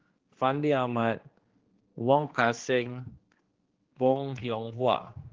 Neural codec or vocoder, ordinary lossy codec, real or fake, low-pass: codec, 16 kHz, 2 kbps, X-Codec, HuBERT features, trained on general audio; Opus, 16 kbps; fake; 7.2 kHz